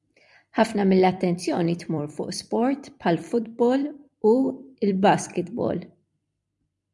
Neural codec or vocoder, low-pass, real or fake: vocoder, 44.1 kHz, 128 mel bands every 256 samples, BigVGAN v2; 10.8 kHz; fake